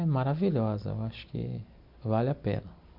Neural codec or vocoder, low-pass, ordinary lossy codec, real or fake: none; 5.4 kHz; MP3, 48 kbps; real